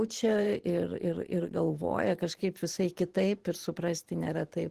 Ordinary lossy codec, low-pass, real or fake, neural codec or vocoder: Opus, 16 kbps; 14.4 kHz; fake; vocoder, 44.1 kHz, 128 mel bands every 512 samples, BigVGAN v2